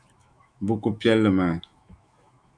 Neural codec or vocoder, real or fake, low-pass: autoencoder, 48 kHz, 128 numbers a frame, DAC-VAE, trained on Japanese speech; fake; 9.9 kHz